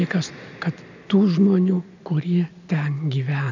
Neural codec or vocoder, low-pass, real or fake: none; 7.2 kHz; real